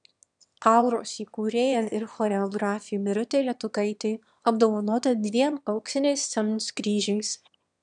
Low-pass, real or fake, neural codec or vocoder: 9.9 kHz; fake; autoencoder, 22.05 kHz, a latent of 192 numbers a frame, VITS, trained on one speaker